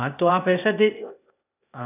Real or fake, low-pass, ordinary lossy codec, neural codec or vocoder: fake; 3.6 kHz; none; codec, 16 kHz, 0.8 kbps, ZipCodec